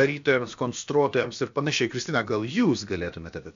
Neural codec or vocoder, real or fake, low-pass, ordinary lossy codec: codec, 16 kHz, about 1 kbps, DyCAST, with the encoder's durations; fake; 7.2 kHz; MP3, 64 kbps